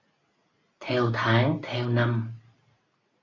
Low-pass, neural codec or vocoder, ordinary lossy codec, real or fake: 7.2 kHz; none; AAC, 32 kbps; real